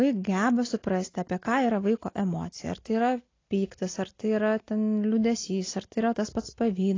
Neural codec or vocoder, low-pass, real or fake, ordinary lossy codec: none; 7.2 kHz; real; AAC, 32 kbps